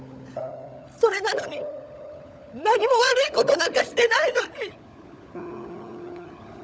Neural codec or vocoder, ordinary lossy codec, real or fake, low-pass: codec, 16 kHz, 16 kbps, FunCodec, trained on LibriTTS, 50 frames a second; none; fake; none